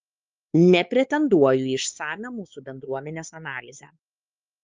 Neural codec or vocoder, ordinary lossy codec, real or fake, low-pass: codec, 16 kHz, 4 kbps, X-Codec, WavLM features, trained on Multilingual LibriSpeech; Opus, 24 kbps; fake; 7.2 kHz